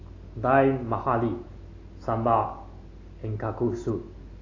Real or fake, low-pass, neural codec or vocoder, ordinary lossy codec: real; 7.2 kHz; none; AAC, 32 kbps